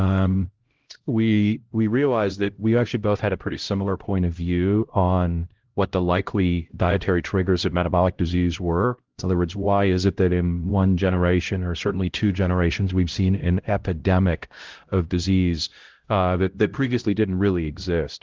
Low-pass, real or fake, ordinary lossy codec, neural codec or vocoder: 7.2 kHz; fake; Opus, 16 kbps; codec, 16 kHz, 0.5 kbps, X-Codec, HuBERT features, trained on LibriSpeech